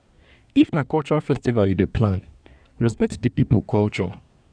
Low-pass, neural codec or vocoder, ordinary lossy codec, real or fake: 9.9 kHz; codec, 24 kHz, 1 kbps, SNAC; none; fake